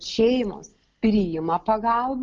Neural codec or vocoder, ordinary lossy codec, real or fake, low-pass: none; Opus, 24 kbps; real; 7.2 kHz